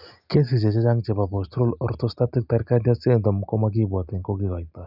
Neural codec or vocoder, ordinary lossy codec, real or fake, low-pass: none; none; real; 5.4 kHz